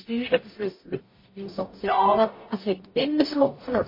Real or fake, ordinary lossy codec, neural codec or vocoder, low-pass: fake; MP3, 24 kbps; codec, 44.1 kHz, 0.9 kbps, DAC; 5.4 kHz